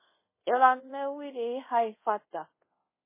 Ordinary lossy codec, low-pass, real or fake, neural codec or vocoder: MP3, 16 kbps; 3.6 kHz; fake; codec, 24 kHz, 0.5 kbps, DualCodec